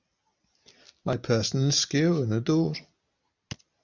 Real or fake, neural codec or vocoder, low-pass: real; none; 7.2 kHz